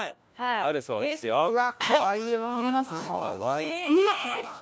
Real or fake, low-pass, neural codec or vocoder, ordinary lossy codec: fake; none; codec, 16 kHz, 1 kbps, FunCodec, trained on LibriTTS, 50 frames a second; none